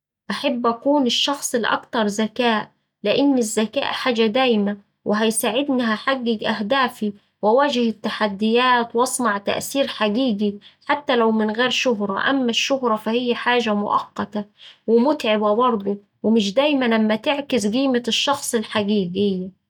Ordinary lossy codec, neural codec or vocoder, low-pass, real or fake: none; none; 19.8 kHz; real